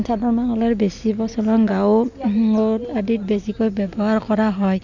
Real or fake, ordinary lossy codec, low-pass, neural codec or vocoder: real; none; 7.2 kHz; none